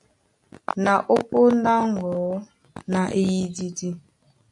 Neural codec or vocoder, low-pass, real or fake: none; 10.8 kHz; real